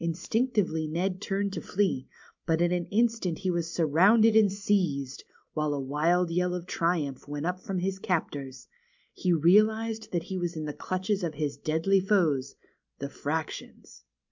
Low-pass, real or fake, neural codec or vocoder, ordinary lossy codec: 7.2 kHz; real; none; MP3, 64 kbps